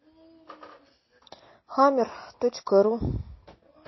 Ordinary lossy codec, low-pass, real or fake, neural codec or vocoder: MP3, 24 kbps; 7.2 kHz; real; none